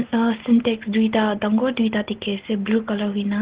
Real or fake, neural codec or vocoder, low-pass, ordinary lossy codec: real; none; 3.6 kHz; Opus, 16 kbps